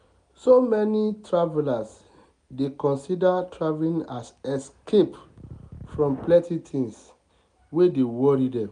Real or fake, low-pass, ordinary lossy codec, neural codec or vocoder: real; 9.9 kHz; none; none